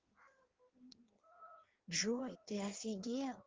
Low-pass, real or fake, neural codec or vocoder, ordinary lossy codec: 7.2 kHz; fake; codec, 16 kHz in and 24 kHz out, 1.1 kbps, FireRedTTS-2 codec; Opus, 16 kbps